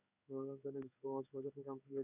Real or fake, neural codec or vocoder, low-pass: fake; codec, 16 kHz in and 24 kHz out, 1 kbps, XY-Tokenizer; 3.6 kHz